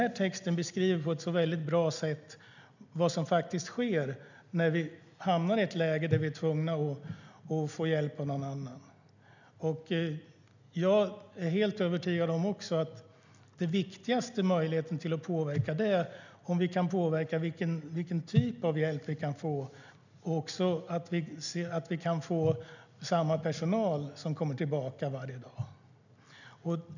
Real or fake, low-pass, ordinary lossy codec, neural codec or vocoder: real; 7.2 kHz; none; none